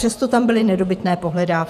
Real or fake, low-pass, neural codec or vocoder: fake; 14.4 kHz; vocoder, 48 kHz, 128 mel bands, Vocos